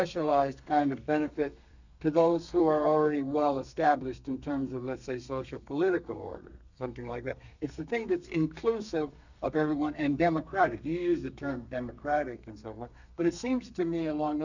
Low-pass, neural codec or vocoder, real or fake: 7.2 kHz; codec, 32 kHz, 1.9 kbps, SNAC; fake